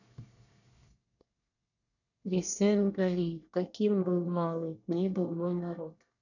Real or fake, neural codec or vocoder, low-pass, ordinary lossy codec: fake; codec, 24 kHz, 1 kbps, SNAC; 7.2 kHz; none